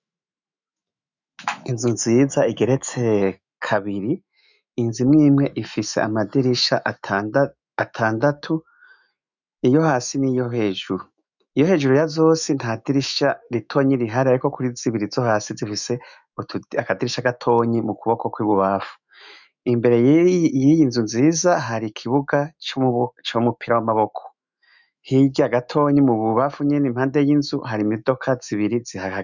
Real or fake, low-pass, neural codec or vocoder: fake; 7.2 kHz; autoencoder, 48 kHz, 128 numbers a frame, DAC-VAE, trained on Japanese speech